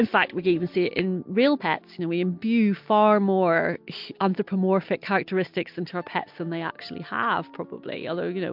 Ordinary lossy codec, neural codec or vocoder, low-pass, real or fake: MP3, 48 kbps; none; 5.4 kHz; real